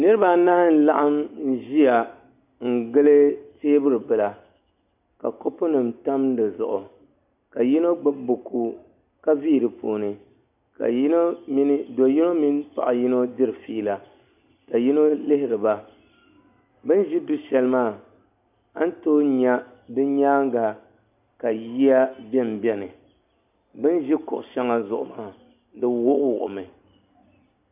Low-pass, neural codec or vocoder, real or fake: 3.6 kHz; none; real